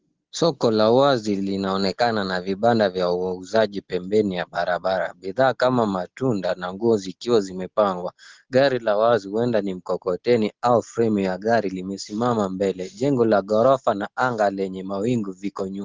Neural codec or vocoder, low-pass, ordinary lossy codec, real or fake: none; 7.2 kHz; Opus, 16 kbps; real